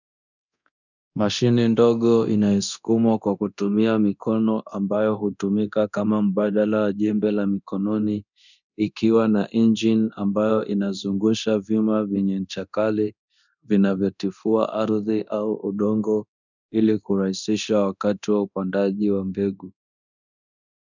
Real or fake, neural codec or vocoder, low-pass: fake; codec, 24 kHz, 0.9 kbps, DualCodec; 7.2 kHz